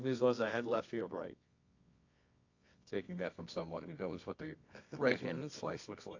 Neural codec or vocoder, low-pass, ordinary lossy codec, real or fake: codec, 24 kHz, 0.9 kbps, WavTokenizer, medium music audio release; 7.2 kHz; AAC, 48 kbps; fake